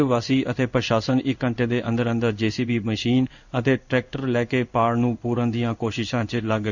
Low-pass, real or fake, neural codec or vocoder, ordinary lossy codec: 7.2 kHz; fake; codec, 16 kHz in and 24 kHz out, 1 kbps, XY-Tokenizer; none